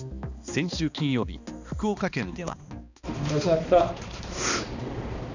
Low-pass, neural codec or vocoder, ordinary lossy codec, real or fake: 7.2 kHz; codec, 16 kHz, 2 kbps, X-Codec, HuBERT features, trained on balanced general audio; none; fake